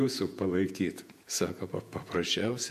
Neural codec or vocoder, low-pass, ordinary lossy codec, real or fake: vocoder, 48 kHz, 128 mel bands, Vocos; 14.4 kHz; MP3, 64 kbps; fake